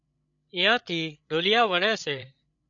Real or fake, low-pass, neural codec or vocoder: fake; 7.2 kHz; codec, 16 kHz, 8 kbps, FreqCodec, larger model